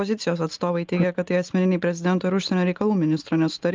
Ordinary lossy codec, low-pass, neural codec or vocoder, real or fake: Opus, 24 kbps; 7.2 kHz; none; real